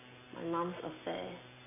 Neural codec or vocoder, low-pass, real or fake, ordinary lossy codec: none; 3.6 kHz; real; none